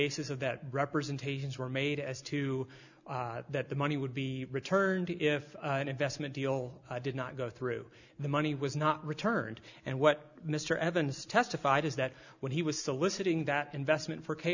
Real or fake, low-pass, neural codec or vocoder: real; 7.2 kHz; none